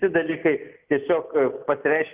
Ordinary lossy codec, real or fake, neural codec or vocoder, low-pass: Opus, 24 kbps; real; none; 3.6 kHz